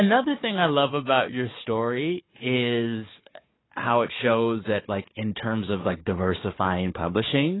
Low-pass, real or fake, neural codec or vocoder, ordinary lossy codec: 7.2 kHz; fake; codec, 16 kHz, 4 kbps, FunCodec, trained on Chinese and English, 50 frames a second; AAC, 16 kbps